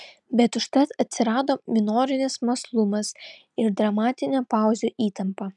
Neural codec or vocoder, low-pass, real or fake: none; 10.8 kHz; real